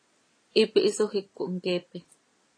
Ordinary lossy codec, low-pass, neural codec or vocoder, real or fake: AAC, 32 kbps; 9.9 kHz; none; real